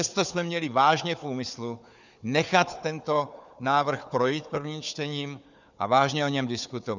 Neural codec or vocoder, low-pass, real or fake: codec, 16 kHz, 4 kbps, FunCodec, trained on Chinese and English, 50 frames a second; 7.2 kHz; fake